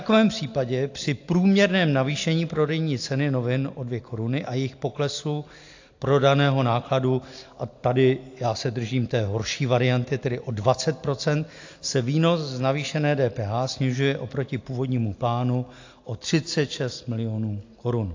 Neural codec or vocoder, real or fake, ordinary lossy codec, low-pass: none; real; AAC, 48 kbps; 7.2 kHz